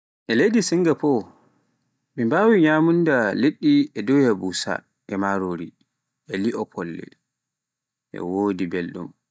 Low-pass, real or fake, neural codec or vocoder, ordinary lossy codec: none; real; none; none